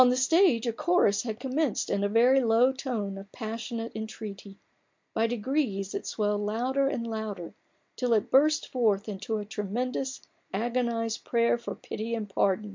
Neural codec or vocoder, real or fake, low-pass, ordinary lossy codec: none; real; 7.2 kHz; MP3, 64 kbps